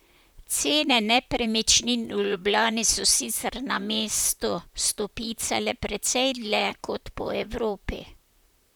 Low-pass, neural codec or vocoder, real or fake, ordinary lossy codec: none; vocoder, 44.1 kHz, 128 mel bands, Pupu-Vocoder; fake; none